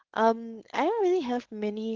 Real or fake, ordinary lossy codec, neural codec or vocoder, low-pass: fake; Opus, 16 kbps; codec, 16 kHz, 4.8 kbps, FACodec; 7.2 kHz